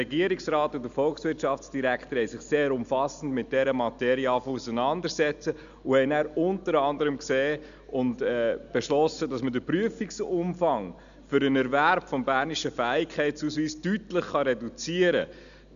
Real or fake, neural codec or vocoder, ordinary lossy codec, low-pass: real; none; none; 7.2 kHz